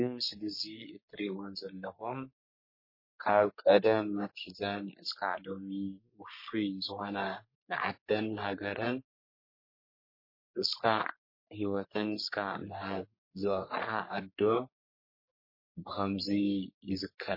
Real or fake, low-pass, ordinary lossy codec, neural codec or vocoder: fake; 5.4 kHz; MP3, 24 kbps; codec, 44.1 kHz, 3.4 kbps, Pupu-Codec